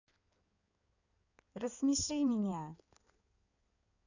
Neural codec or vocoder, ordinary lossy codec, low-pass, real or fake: codec, 16 kHz in and 24 kHz out, 2.2 kbps, FireRedTTS-2 codec; none; 7.2 kHz; fake